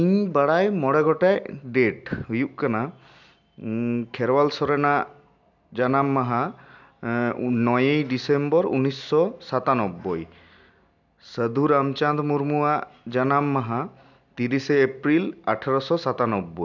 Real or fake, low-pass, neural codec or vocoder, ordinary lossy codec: real; 7.2 kHz; none; none